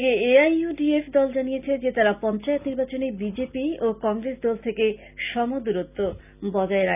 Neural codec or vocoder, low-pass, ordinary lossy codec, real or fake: none; 3.6 kHz; none; real